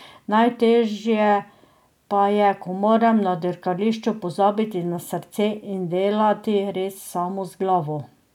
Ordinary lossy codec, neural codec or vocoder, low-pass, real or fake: none; none; 19.8 kHz; real